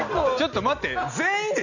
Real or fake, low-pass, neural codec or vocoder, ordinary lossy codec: real; 7.2 kHz; none; none